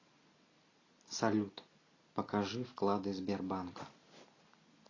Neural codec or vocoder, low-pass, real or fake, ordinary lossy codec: none; 7.2 kHz; real; AAC, 32 kbps